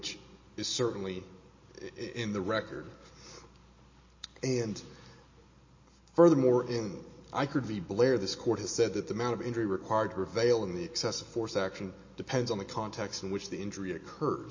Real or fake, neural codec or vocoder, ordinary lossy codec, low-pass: real; none; MP3, 32 kbps; 7.2 kHz